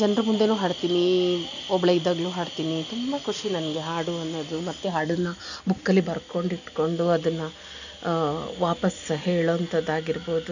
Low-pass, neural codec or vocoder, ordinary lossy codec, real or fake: 7.2 kHz; none; none; real